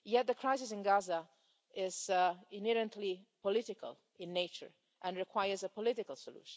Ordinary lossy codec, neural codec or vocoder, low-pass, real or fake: none; none; none; real